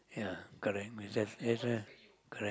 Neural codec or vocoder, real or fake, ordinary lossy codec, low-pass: none; real; none; none